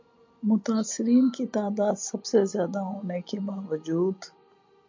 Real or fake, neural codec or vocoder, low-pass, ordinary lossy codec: real; none; 7.2 kHz; MP3, 48 kbps